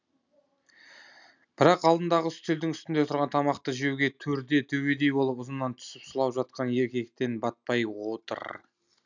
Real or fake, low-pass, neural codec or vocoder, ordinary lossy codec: real; 7.2 kHz; none; none